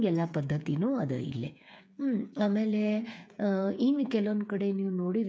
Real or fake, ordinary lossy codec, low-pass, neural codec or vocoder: fake; none; none; codec, 16 kHz, 8 kbps, FreqCodec, smaller model